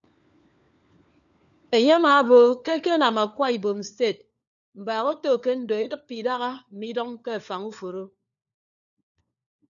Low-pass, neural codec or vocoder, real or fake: 7.2 kHz; codec, 16 kHz, 4 kbps, FunCodec, trained on LibriTTS, 50 frames a second; fake